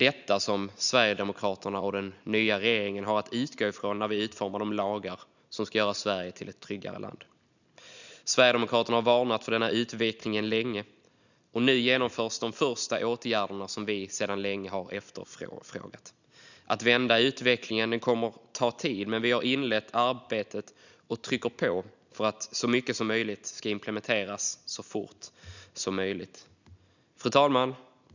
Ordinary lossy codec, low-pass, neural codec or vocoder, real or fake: none; 7.2 kHz; none; real